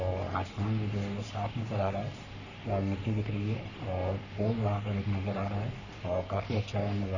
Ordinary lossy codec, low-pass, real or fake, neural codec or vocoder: none; 7.2 kHz; fake; codec, 44.1 kHz, 3.4 kbps, Pupu-Codec